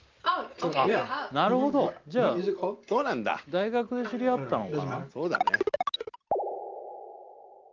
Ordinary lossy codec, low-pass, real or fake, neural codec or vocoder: Opus, 24 kbps; 7.2 kHz; real; none